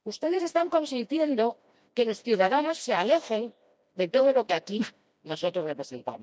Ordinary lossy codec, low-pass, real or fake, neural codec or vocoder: none; none; fake; codec, 16 kHz, 1 kbps, FreqCodec, smaller model